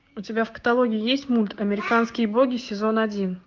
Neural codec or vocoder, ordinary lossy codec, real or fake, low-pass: codec, 16 kHz, 6 kbps, DAC; Opus, 24 kbps; fake; 7.2 kHz